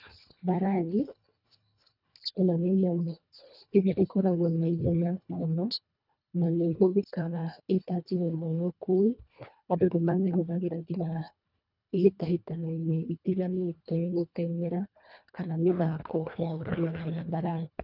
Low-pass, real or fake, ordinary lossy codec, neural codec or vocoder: 5.4 kHz; fake; none; codec, 24 kHz, 1.5 kbps, HILCodec